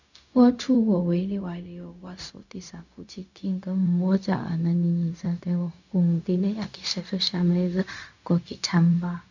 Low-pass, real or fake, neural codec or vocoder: 7.2 kHz; fake; codec, 16 kHz, 0.4 kbps, LongCat-Audio-Codec